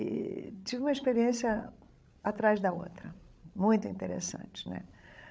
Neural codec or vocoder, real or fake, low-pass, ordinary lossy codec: codec, 16 kHz, 16 kbps, FreqCodec, larger model; fake; none; none